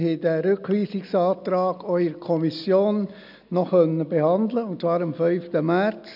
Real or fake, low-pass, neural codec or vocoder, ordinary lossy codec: real; 5.4 kHz; none; none